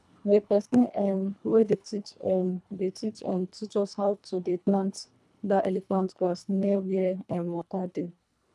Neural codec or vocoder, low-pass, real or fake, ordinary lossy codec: codec, 24 kHz, 1.5 kbps, HILCodec; none; fake; none